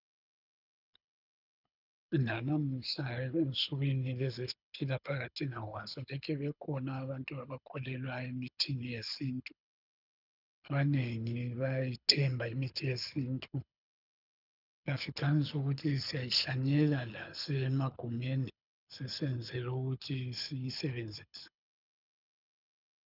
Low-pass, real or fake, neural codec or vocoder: 5.4 kHz; fake; codec, 24 kHz, 6 kbps, HILCodec